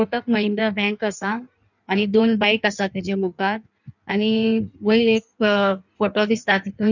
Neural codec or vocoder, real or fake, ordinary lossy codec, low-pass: codec, 16 kHz in and 24 kHz out, 1.1 kbps, FireRedTTS-2 codec; fake; none; 7.2 kHz